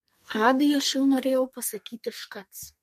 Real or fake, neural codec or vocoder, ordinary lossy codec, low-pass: fake; codec, 32 kHz, 1.9 kbps, SNAC; MP3, 64 kbps; 14.4 kHz